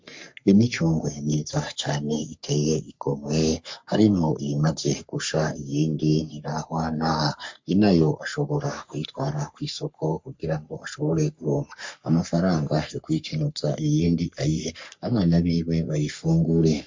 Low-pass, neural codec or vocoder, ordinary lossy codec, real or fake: 7.2 kHz; codec, 44.1 kHz, 3.4 kbps, Pupu-Codec; MP3, 48 kbps; fake